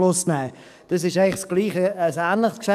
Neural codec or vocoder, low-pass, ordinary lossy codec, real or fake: codec, 44.1 kHz, 7.8 kbps, DAC; 14.4 kHz; none; fake